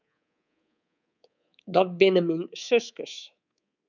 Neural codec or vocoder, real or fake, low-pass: codec, 24 kHz, 3.1 kbps, DualCodec; fake; 7.2 kHz